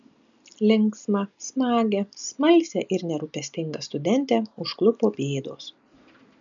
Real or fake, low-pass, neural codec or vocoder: real; 7.2 kHz; none